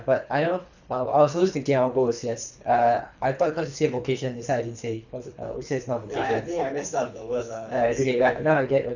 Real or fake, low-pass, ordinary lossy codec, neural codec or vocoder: fake; 7.2 kHz; none; codec, 24 kHz, 3 kbps, HILCodec